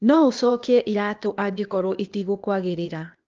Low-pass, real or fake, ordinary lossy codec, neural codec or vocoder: 7.2 kHz; fake; Opus, 24 kbps; codec, 16 kHz, 0.8 kbps, ZipCodec